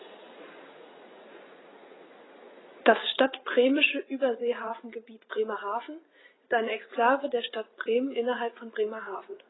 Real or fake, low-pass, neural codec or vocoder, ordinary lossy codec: fake; 7.2 kHz; vocoder, 44.1 kHz, 80 mel bands, Vocos; AAC, 16 kbps